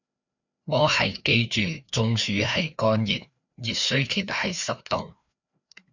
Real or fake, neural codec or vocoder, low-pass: fake; codec, 16 kHz, 2 kbps, FreqCodec, larger model; 7.2 kHz